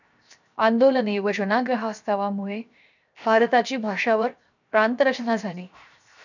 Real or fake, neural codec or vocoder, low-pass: fake; codec, 16 kHz, 0.7 kbps, FocalCodec; 7.2 kHz